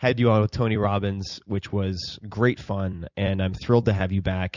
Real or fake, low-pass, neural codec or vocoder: fake; 7.2 kHz; vocoder, 44.1 kHz, 128 mel bands every 256 samples, BigVGAN v2